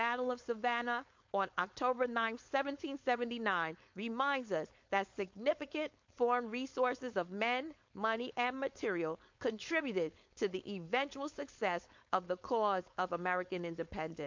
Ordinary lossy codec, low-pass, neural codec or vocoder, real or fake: MP3, 48 kbps; 7.2 kHz; codec, 16 kHz, 4.8 kbps, FACodec; fake